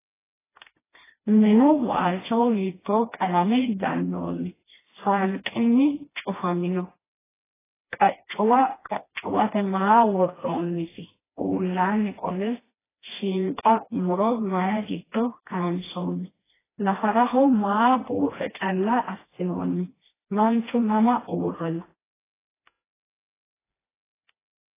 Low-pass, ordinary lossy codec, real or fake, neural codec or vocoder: 3.6 kHz; AAC, 16 kbps; fake; codec, 16 kHz, 1 kbps, FreqCodec, smaller model